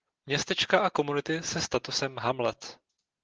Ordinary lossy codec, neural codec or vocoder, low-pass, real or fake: Opus, 16 kbps; none; 7.2 kHz; real